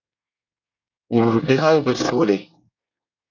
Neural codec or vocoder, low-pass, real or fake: codec, 24 kHz, 1 kbps, SNAC; 7.2 kHz; fake